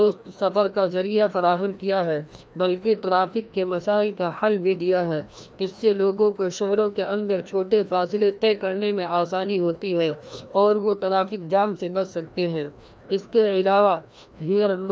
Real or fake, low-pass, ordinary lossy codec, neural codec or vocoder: fake; none; none; codec, 16 kHz, 1 kbps, FreqCodec, larger model